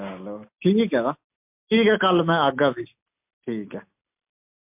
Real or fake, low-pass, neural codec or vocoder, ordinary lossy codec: real; 3.6 kHz; none; MP3, 32 kbps